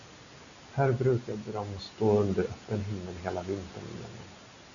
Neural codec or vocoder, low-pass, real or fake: none; 7.2 kHz; real